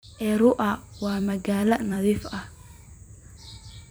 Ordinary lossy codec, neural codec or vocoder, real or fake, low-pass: none; vocoder, 44.1 kHz, 128 mel bands every 256 samples, BigVGAN v2; fake; none